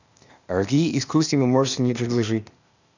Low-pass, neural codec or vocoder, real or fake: 7.2 kHz; codec, 16 kHz, 0.8 kbps, ZipCodec; fake